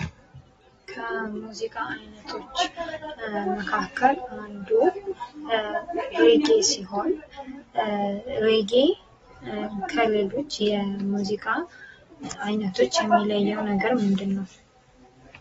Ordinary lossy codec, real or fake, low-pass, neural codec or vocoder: AAC, 24 kbps; real; 19.8 kHz; none